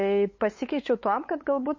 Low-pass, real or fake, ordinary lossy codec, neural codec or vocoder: 7.2 kHz; fake; MP3, 32 kbps; codec, 16 kHz, 8 kbps, FunCodec, trained on LibriTTS, 25 frames a second